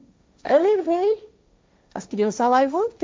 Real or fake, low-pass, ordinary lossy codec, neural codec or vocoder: fake; none; none; codec, 16 kHz, 1.1 kbps, Voila-Tokenizer